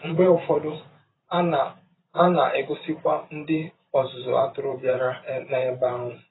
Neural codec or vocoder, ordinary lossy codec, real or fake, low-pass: vocoder, 44.1 kHz, 128 mel bands every 512 samples, BigVGAN v2; AAC, 16 kbps; fake; 7.2 kHz